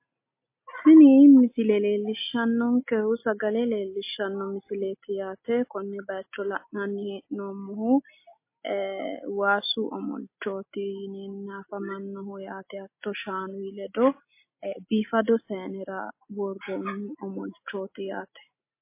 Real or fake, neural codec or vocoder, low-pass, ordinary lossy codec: real; none; 3.6 kHz; MP3, 24 kbps